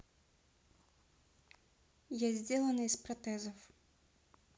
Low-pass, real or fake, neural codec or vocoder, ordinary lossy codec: none; real; none; none